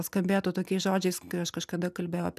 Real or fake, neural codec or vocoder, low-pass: real; none; 14.4 kHz